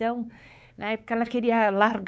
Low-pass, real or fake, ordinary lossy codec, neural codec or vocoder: none; fake; none; codec, 16 kHz, 4 kbps, X-Codec, WavLM features, trained on Multilingual LibriSpeech